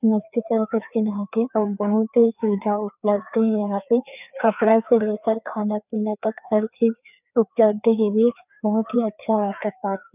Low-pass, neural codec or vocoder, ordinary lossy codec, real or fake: 3.6 kHz; codec, 16 kHz, 2 kbps, FreqCodec, larger model; none; fake